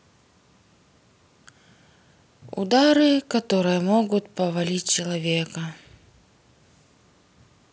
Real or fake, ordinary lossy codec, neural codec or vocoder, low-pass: real; none; none; none